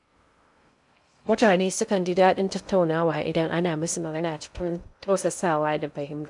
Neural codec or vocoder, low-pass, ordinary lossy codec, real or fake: codec, 16 kHz in and 24 kHz out, 0.6 kbps, FocalCodec, streaming, 2048 codes; 10.8 kHz; none; fake